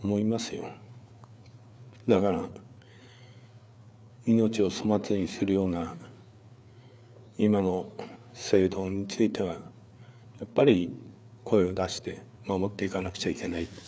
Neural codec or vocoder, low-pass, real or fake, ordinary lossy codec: codec, 16 kHz, 4 kbps, FreqCodec, larger model; none; fake; none